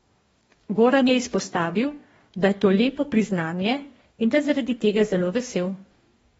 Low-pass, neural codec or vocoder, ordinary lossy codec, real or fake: 19.8 kHz; codec, 44.1 kHz, 2.6 kbps, DAC; AAC, 24 kbps; fake